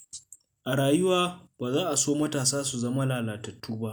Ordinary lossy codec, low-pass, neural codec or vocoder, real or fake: none; none; none; real